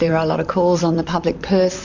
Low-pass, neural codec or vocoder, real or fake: 7.2 kHz; vocoder, 44.1 kHz, 128 mel bands, Pupu-Vocoder; fake